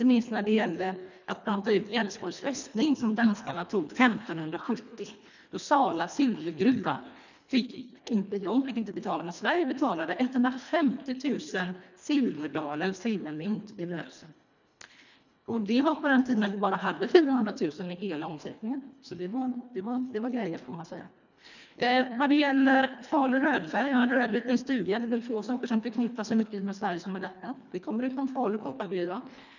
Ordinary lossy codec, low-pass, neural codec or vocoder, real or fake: none; 7.2 kHz; codec, 24 kHz, 1.5 kbps, HILCodec; fake